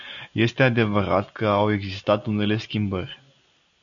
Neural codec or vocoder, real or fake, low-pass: none; real; 7.2 kHz